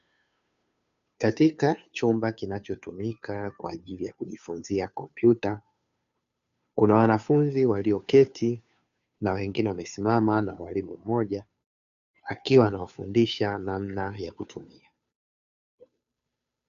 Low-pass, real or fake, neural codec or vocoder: 7.2 kHz; fake; codec, 16 kHz, 2 kbps, FunCodec, trained on Chinese and English, 25 frames a second